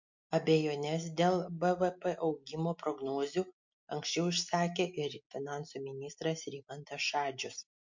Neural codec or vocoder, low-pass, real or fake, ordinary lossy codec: none; 7.2 kHz; real; MP3, 48 kbps